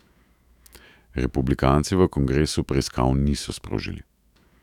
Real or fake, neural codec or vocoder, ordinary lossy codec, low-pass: fake; autoencoder, 48 kHz, 128 numbers a frame, DAC-VAE, trained on Japanese speech; none; 19.8 kHz